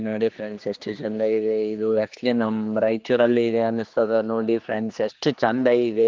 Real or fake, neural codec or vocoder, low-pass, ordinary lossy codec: fake; codec, 16 kHz, 2 kbps, X-Codec, HuBERT features, trained on general audio; 7.2 kHz; Opus, 32 kbps